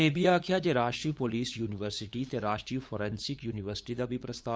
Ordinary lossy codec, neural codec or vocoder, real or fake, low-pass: none; codec, 16 kHz, 8 kbps, FunCodec, trained on LibriTTS, 25 frames a second; fake; none